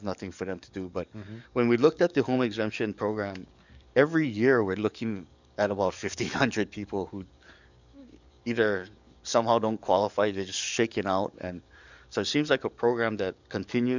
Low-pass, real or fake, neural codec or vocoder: 7.2 kHz; fake; codec, 44.1 kHz, 7.8 kbps, DAC